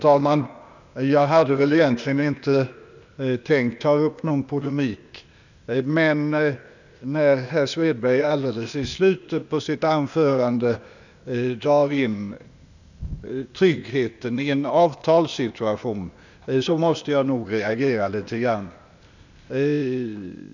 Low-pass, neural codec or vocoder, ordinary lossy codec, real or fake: 7.2 kHz; codec, 16 kHz, 0.8 kbps, ZipCodec; none; fake